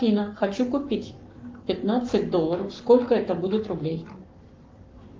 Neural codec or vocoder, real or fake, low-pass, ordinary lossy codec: codec, 44.1 kHz, 7.8 kbps, Pupu-Codec; fake; 7.2 kHz; Opus, 32 kbps